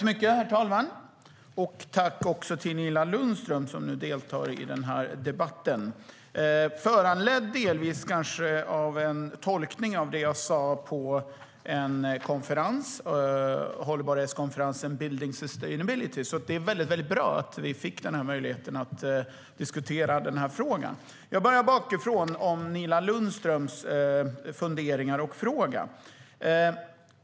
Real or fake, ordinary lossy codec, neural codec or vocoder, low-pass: real; none; none; none